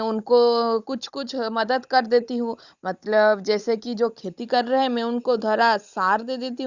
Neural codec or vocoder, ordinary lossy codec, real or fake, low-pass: codec, 16 kHz, 16 kbps, FunCodec, trained on Chinese and English, 50 frames a second; Opus, 64 kbps; fake; 7.2 kHz